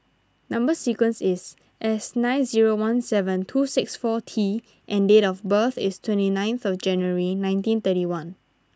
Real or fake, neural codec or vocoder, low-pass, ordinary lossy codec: real; none; none; none